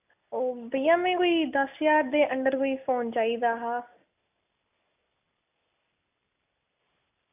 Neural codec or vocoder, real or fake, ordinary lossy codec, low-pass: none; real; AAC, 32 kbps; 3.6 kHz